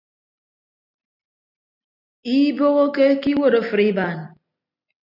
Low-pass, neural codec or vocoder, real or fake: 5.4 kHz; none; real